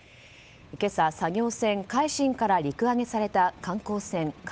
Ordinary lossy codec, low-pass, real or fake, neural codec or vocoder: none; none; fake; codec, 16 kHz, 8 kbps, FunCodec, trained on Chinese and English, 25 frames a second